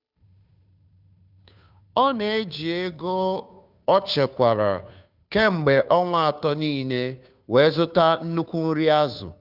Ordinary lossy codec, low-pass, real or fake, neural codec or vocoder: none; 5.4 kHz; fake; codec, 16 kHz, 2 kbps, FunCodec, trained on Chinese and English, 25 frames a second